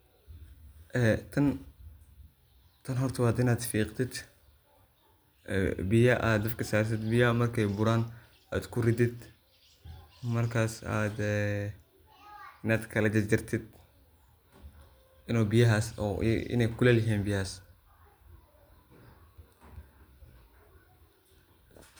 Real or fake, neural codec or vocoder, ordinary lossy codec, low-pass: real; none; none; none